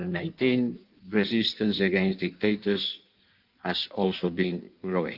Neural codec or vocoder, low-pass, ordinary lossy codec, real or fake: codec, 16 kHz in and 24 kHz out, 1.1 kbps, FireRedTTS-2 codec; 5.4 kHz; Opus, 32 kbps; fake